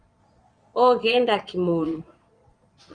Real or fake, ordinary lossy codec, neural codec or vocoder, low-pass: fake; Opus, 32 kbps; vocoder, 22.05 kHz, 80 mel bands, Vocos; 9.9 kHz